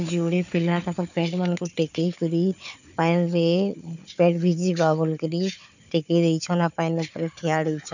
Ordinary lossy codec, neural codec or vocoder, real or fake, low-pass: none; codec, 16 kHz, 4 kbps, FunCodec, trained on Chinese and English, 50 frames a second; fake; 7.2 kHz